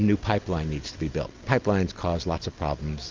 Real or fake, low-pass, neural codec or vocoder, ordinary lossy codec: real; 7.2 kHz; none; Opus, 32 kbps